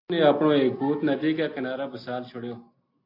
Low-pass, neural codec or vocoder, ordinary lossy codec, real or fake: 5.4 kHz; none; AAC, 32 kbps; real